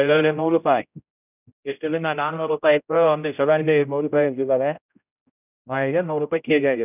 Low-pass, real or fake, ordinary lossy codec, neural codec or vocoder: 3.6 kHz; fake; none; codec, 16 kHz, 0.5 kbps, X-Codec, HuBERT features, trained on general audio